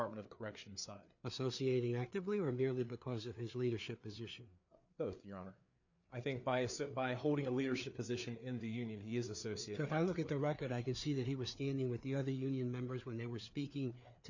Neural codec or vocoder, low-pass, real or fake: codec, 16 kHz, 4 kbps, FreqCodec, larger model; 7.2 kHz; fake